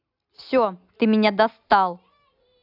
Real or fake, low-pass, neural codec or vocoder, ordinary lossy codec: real; 5.4 kHz; none; AAC, 48 kbps